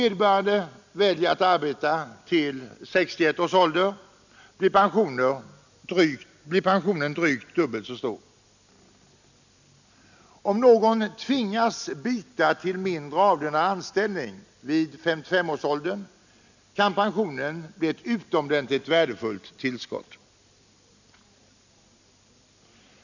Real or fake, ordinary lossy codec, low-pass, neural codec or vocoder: real; none; 7.2 kHz; none